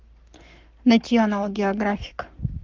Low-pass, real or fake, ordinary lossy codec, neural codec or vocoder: 7.2 kHz; fake; Opus, 24 kbps; codec, 44.1 kHz, 7.8 kbps, Pupu-Codec